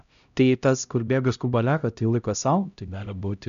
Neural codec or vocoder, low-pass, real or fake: codec, 16 kHz, 0.5 kbps, X-Codec, HuBERT features, trained on LibriSpeech; 7.2 kHz; fake